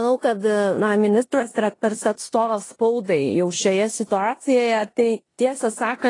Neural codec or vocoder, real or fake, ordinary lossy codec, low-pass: codec, 16 kHz in and 24 kHz out, 0.9 kbps, LongCat-Audio-Codec, four codebook decoder; fake; AAC, 32 kbps; 10.8 kHz